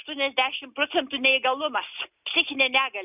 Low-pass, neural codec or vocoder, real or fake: 3.6 kHz; none; real